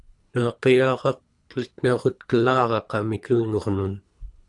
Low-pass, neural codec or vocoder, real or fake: 10.8 kHz; codec, 24 kHz, 3 kbps, HILCodec; fake